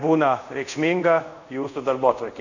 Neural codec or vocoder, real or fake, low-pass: codec, 24 kHz, 0.5 kbps, DualCodec; fake; 7.2 kHz